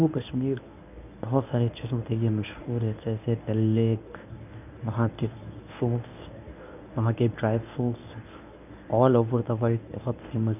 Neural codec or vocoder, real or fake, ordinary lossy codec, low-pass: codec, 24 kHz, 0.9 kbps, WavTokenizer, medium speech release version 1; fake; none; 3.6 kHz